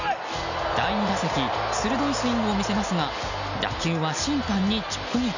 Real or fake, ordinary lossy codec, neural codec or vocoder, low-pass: real; none; none; 7.2 kHz